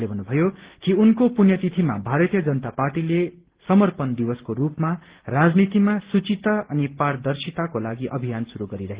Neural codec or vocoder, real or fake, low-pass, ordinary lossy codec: none; real; 3.6 kHz; Opus, 16 kbps